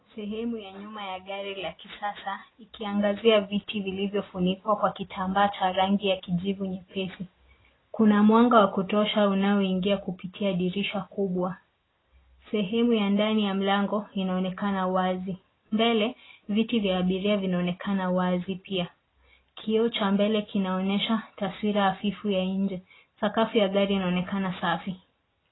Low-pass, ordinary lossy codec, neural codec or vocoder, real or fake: 7.2 kHz; AAC, 16 kbps; none; real